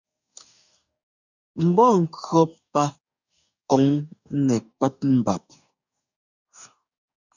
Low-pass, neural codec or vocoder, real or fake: 7.2 kHz; codec, 44.1 kHz, 2.6 kbps, DAC; fake